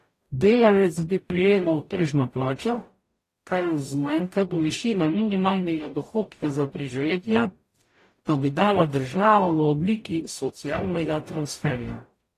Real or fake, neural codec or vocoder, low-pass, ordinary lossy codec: fake; codec, 44.1 kHz, 0.9 kbps, DAC; 14.4 kHz; AAC, 48 kbps